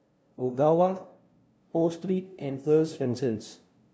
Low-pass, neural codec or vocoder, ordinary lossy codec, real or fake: none; codec, 16 kHz, 0.5 kbps, FunCodec, trained on LibriTTS, 25 frames a second; none; fake